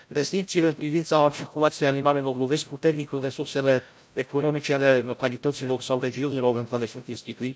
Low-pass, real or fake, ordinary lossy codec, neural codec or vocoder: none; fake; none; codec, 16 kHz, 0.5 kbps, FreqCodec, larger model